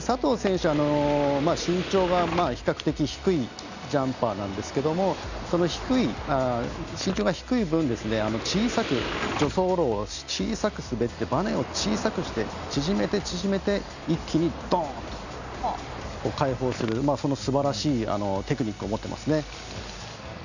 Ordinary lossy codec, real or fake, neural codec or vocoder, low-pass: none; real; none; 7.2 kHz